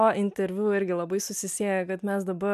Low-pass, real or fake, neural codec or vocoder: 14.4 kHz; real; none